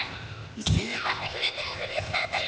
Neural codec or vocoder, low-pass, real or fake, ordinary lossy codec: codec, 16 kHz, 0.8 kbps, ZipCodec; none; fake; none